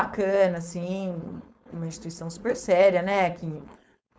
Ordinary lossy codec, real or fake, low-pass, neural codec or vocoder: none; fake; none; codec, 16 kHz, 4.8 kbps, FACodec